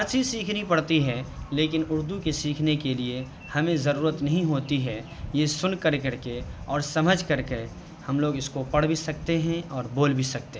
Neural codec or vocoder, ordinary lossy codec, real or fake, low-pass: none; none; real; none